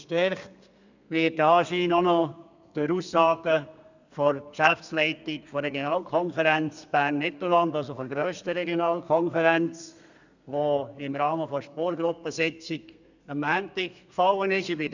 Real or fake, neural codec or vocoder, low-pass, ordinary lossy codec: fake; codec, 32 kHz, 1.9 kbps, SNAC; 7.2 kHz; none